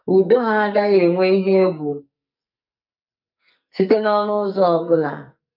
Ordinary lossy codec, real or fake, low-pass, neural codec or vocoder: none; fake; 5.4 kHz; codec, 44.1 kHz, 2.6 kbps, SNAC